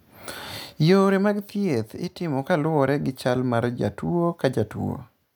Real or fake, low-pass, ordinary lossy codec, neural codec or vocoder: real; none; none; none